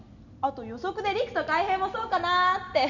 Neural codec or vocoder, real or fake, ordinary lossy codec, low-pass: none; real; none; 7.2 kHz